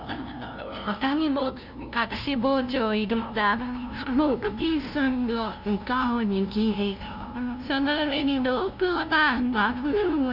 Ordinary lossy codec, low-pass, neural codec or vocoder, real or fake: none; 5.4 kHz; codec, 16 kHz, 0.5 kbps, FunCodec, trained on LibriTTS, 25 frames a second; fake